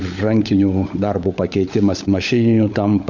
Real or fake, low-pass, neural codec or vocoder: fake; 7.2 kHz; codec, 16 kHz, 16 kbps, FunCodec, trained on LibriTTS, 50 frames a second